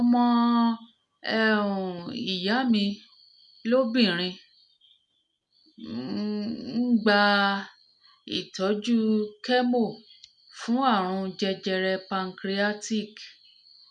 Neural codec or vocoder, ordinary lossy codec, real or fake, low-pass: none; MP3, 96 kbps; real; 10.8 kHz